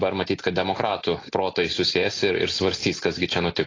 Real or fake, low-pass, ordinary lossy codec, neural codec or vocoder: real; 7.2 kHz; AAC, 32 kbps; none